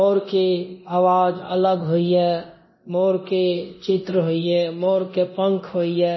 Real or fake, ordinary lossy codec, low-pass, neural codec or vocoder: fake; MP3, 24 kbps; 7.2 kHz; codec, 24 kHz, 0.9 kbps, DualCodec